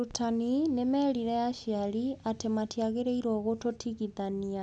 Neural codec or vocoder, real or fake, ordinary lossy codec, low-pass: none; real; none; none